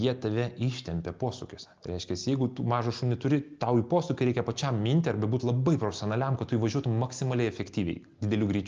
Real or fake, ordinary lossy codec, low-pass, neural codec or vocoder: real; Opus, 24 kbps; 7.2 kHz; none